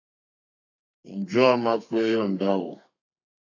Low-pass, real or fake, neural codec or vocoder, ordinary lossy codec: 7.2 kHz; fake; codec, 32 kHz, 1.9 kbps, SNAC; AAC, 48 kbps